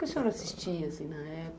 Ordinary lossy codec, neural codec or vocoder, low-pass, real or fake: none; none; none; real